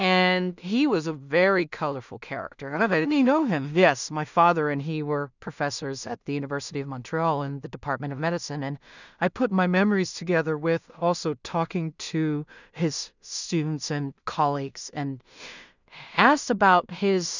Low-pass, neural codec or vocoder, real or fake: 7.2 kHz; codec, 16 kHz in and 24 kHz out, 0.4 kbps, LongCat-Audio-Codec, two codebook decoder; fake